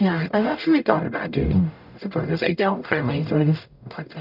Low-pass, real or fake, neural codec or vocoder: 5.4 kHz; fake; codec, 44.1 kHz, 0.9 kbps, DAC